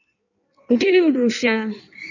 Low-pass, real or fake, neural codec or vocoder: 7.2 kHz; fake; codec, 16 kHz in and 24 kHz out, 1.1 kbps, FireRedTTS-2 codec